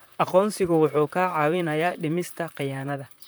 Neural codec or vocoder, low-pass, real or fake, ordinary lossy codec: vocoder, 44.1 kHz, 128 mel bands, Pupu-Vocoder; none; fake; none